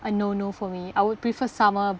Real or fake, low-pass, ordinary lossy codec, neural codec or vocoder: real; none; none; none